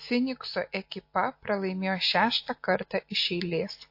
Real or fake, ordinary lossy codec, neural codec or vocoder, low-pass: real; MP3, 32 kbps; none; 5.4 kHz